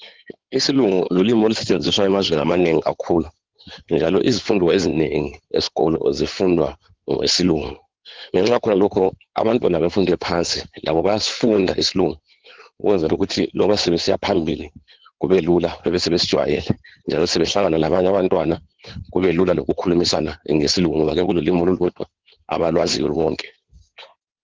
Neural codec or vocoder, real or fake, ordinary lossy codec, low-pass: codec, 16 kHz in and 24 kHz out, 2.2 kbps, FireRedTTS-2 codec; fake; Opus, 16 kbps; 7.2 kHz